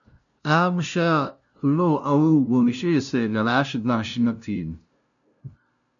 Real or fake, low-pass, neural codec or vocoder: fake; 7.2 kHz; codec, 16 kHz, 0.5 kbps, FunCodec, trained on LibriTTS, 25 frames a second